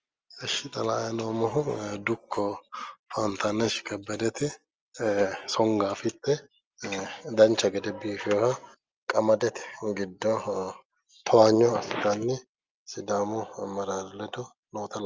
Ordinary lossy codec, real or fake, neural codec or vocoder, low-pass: Opus, 24 kbps; real; none; 7.2 kHz